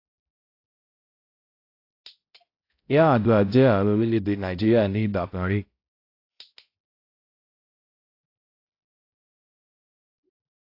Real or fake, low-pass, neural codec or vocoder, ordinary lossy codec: fake; 5.4 kHz; codec, 16 kHz, 0.5 kbps, X-Codec, HuBERT features, trained on balanced general audio; AAC, 32 kbps